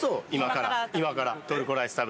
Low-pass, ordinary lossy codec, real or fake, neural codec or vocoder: none; none; real; none